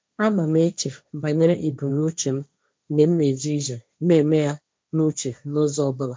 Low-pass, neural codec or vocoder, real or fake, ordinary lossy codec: none; codec, 16 kHz, 1.1 kbps, Voila-Tokenizer; fake; none